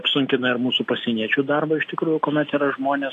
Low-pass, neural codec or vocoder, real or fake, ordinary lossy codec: 14.4 kHz; none; real; AAC, 48 kbps